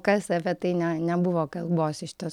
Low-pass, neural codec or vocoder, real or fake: 19.8 kHz; none; real